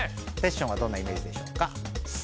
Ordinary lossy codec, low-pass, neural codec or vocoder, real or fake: none; none; none; real